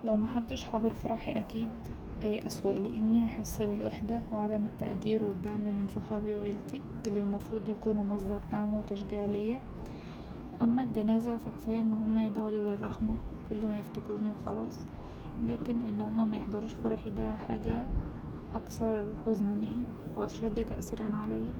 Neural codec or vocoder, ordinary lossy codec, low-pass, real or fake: codec, 44.1 kHz, 2.6 kbps, DAC; none; none; fake